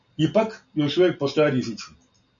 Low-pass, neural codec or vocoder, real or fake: 7.2 kHz; none; real